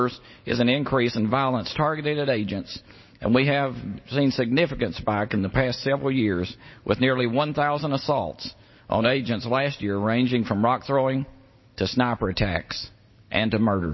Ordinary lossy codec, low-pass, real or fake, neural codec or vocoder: MP3, 24 kbps; 7.2 kHz; real; none